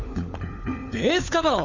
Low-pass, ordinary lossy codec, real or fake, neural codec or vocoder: 7.2 kHz; none; fake; codec, 16 kHz, 4 kbps, FunCodec, trained on LibriTTS, 50 frames a second